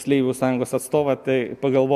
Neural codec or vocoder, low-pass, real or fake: none; 14.4 kHz; real